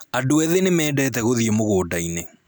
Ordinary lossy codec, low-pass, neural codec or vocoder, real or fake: none; none; none; real